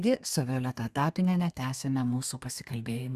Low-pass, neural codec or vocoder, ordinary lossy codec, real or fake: 14.4 kHz; codec, 32 kHz, 1.9 kbps, SNAC; Opus, 64 kbps; fake